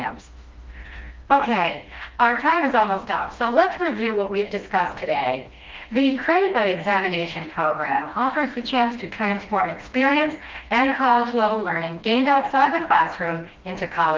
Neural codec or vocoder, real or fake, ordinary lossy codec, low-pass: codec, 16 kHz, 1 kbps, FreqCodec, smaller model; fake; Opus, 24 kbps; 7.2 kHz